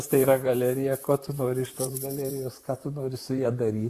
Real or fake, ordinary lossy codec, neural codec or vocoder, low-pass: fake; Opus, 64 kbps; vocoder, 44.1 kHz, 128 mel bands, Pupu-Vocoder; 14.4 kHz